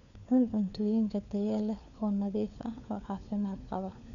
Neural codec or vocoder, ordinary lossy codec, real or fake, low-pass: codec, 16 kHz, 4 kbps, FunCodec, trained on LibriTTS, 50 frames a second; none; fake; 7.2 kHz